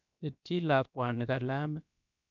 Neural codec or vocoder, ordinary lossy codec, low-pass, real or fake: codec, 16 kHz, about 1 kbps, DyCAST, with the encoder's durations; none; 7.2 kHz; fake